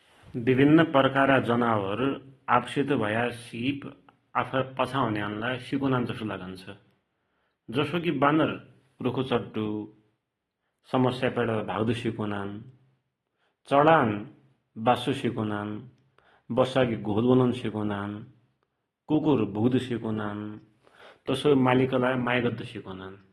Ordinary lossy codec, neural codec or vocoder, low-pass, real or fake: AAC, 32 kbps; none; 19.8 kHz; real